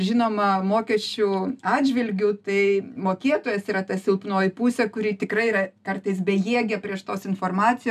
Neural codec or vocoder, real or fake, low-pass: none; real; 14.4 kHz